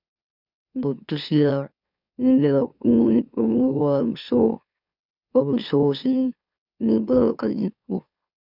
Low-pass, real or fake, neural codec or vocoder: 5.4 kHz; fake; autoencoder, 44.1 kHz, a latent of 192 numbers a frame, MeloTTS